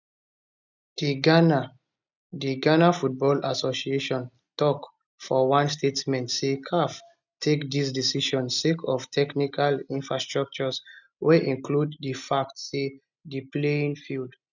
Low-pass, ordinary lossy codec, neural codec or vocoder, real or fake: 7.2 kHz; none; none; real